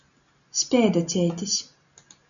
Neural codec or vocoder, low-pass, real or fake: none; 7.2 kHz; real